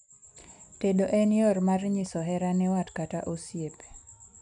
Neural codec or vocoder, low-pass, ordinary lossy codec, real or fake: none; 9.9 kHz; none; real